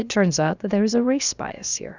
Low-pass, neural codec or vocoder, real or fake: 7.2 kHz; codec, 16 kHz, about 1 kbps, DyCAST, with the encoder's durations; fake